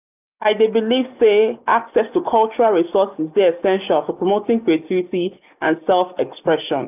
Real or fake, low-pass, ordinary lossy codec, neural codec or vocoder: real; 3.6 kHz; none; none